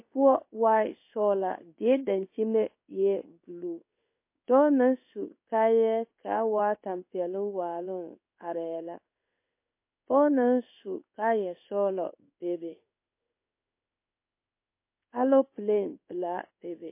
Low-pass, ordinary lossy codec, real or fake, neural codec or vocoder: 3.6 kHz; MP3, 24 kbps; fake; codec, 16 kHz in and 24 kHz out, 1 kbps, XY-Tokenizer